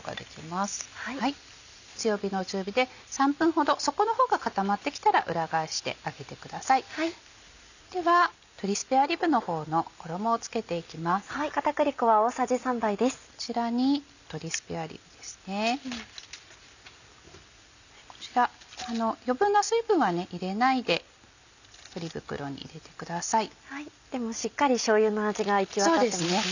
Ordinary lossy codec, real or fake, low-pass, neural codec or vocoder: none; real; 7.2 kHz; none